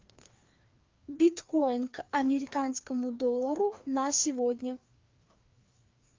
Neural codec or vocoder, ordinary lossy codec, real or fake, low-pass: codec, 16 kHz, 2 kbps, FreqCodec, larger model; Opus, 32 kbps; fake; 7.2 kHz